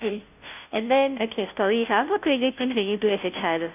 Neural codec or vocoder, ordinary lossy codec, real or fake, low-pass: codec, 16 kHz, 0.5 kbps, FunCodec, trained on Chinese and English, 25 frames a second; none; fake; 3.6 kHz